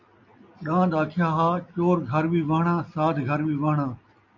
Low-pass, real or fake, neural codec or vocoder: 7.2 kHz; real; none